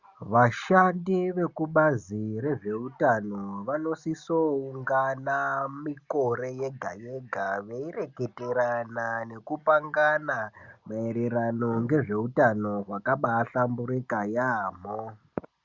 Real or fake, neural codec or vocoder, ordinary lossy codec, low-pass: real; none; Opus, 64 kbps; 7.2 kHz